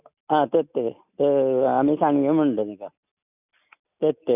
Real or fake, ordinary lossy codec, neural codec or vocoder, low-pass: real; none; none; 3.6 kHz